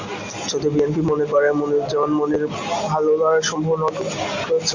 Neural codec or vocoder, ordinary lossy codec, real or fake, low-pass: none; MP3, 64 kbps; real; 7.2 kHz